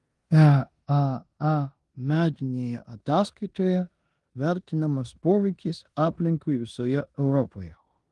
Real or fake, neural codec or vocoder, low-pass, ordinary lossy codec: fake; codec, 16 kHz in and 24 kHz out, 0.9 kbps, LongCat-Audio-Codec, four codebook decoder; 10.8 kHz; Opus, 24 kbps